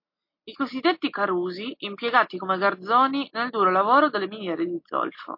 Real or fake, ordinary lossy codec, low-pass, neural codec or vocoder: real; MP3, 32 kbps; 5.4 kHz; none